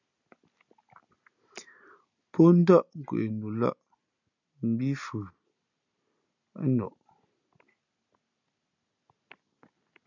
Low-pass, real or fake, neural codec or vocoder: 7.2 kHz; real; none